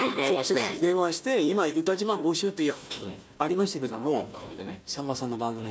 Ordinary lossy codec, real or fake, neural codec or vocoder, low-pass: none; fake; codec, 16 kHz, 1 kbps, FunCodec, trained on Chinese and English, 50 frames a second; none